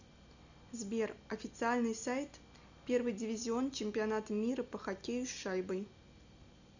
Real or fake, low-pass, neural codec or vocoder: real; 7.2 kHz; none